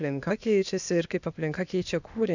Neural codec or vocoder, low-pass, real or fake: codec, 16 kHz, 0.8 kbps, ZipCodec; 7.2 kHz; fake